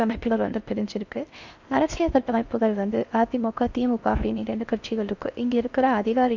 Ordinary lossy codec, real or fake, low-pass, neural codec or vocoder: Opus, 64 kbps; fake; 7.2 kHz; codec, 16 kHz in and 24 kHz out, 0.6 kbps, FocalCodec, streaming, 4096 codes